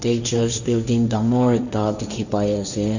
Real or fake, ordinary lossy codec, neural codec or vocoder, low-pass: fake; none; codec, 16 kHz, 1.1 kbps, Voila-Tokenizer; 7.2 kHz